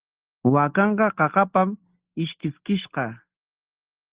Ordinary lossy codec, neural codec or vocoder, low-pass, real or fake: Opus, 16 kbps; none; 3.6 kHz; real